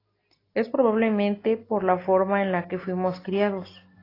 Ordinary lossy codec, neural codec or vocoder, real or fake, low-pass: AAC, 24 kbps; none; real; 5.4 kHz